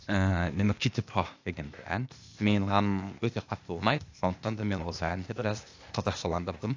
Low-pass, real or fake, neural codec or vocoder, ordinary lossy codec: 7.2 kHz; fake; codec, 16 kHz, 0.8 kbps, ZipCodec; AAC, 48 kbps